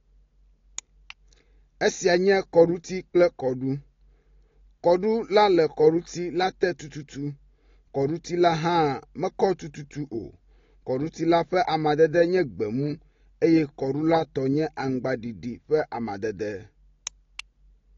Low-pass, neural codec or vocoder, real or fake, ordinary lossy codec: 7.2 kHz; none; real; AAC, 32 kbps